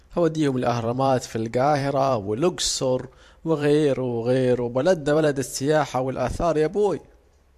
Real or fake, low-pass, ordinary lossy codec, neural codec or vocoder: fake; 14.4 kHz; MP3, 64 kbps; vocoder, 44.1 kHz, 128 mel bands every 512 samples, BigVGAN v2